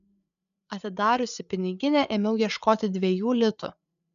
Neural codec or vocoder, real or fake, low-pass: codec, 16 kHz, 8 kbps, FreqCodec, larger model; fake; 7.2 kHz